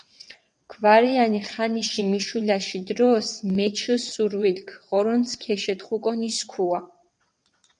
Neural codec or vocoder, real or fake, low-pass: vocoder, 22.05 kHz, 80 mel bands, WaveNeXt; fake; 9.9 kHz